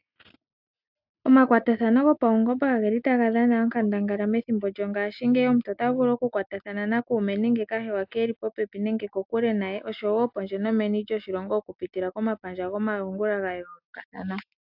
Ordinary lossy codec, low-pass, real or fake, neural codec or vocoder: AAC, 48 kbps; 5.4 kHz; real; none